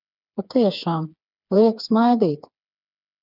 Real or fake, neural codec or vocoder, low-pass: fake; codec, 16 kHz, 4 kbps, FreqCodec, smaller model; 5.4 kHz